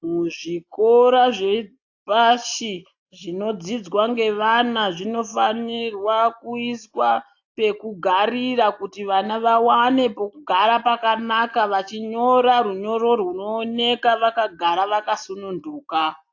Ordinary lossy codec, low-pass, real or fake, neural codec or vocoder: AAC, 48 kbps; 7.2 kHz; real; none